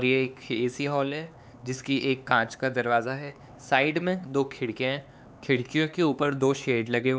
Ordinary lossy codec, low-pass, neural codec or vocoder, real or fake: none; none; codec, 16 kHz, 4 kbps, X-Codec, HuBERT features, trained on LibriSpeech; fake